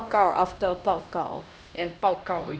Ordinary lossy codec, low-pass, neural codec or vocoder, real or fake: none; none; codec, 16 kHz, 1 kbps, X-Codec, HuBERT features, trained on LibriSpeech; fake